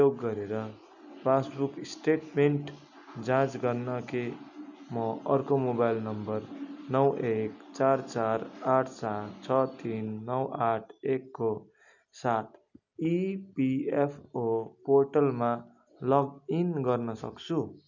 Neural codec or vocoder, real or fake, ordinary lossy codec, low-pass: none; real; none; 7.2 kHz